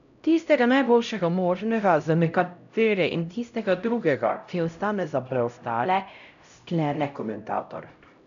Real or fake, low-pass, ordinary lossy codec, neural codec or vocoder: fake; 7.2 kHz; none; codec, 16 kHz, 0.5 kbps, X-Codec, HuBERT features, trained on LibriSpeech